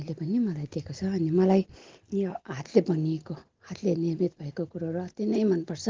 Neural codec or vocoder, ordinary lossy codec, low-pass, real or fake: none; Opus, 16 kbps; 7.2 kHz; real